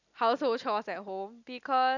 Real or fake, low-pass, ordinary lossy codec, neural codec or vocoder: real; 7.2 kHz; none; none